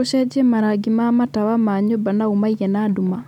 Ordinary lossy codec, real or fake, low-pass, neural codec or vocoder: none; real; 19.8 kHz; none